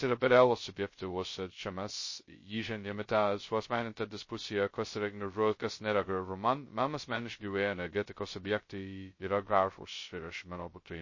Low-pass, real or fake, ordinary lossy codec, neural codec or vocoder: 7.2 kHz; fake; MP3, 32 kbps; codec, 16 kHz, 0.2 kbps, FocalCodec